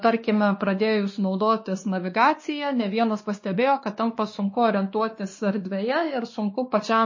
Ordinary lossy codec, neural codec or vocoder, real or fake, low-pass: MP3, 32 kbps; codec, 16 kHz, 2 kbps, X-Codec, WavLM features, trained on Multilingual LibriSpeech; fake; 7.2 kHz